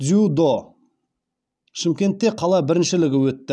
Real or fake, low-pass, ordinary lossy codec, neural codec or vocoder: real; none; none; none